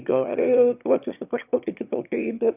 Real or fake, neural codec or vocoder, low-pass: fake; autoencoder, 22.05 kHz, a latent of 192 numbers a frame, VITS, trained on one speaker; 3.6 kHz